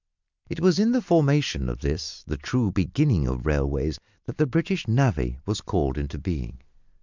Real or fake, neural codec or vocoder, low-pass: real; none; 7.2 kHz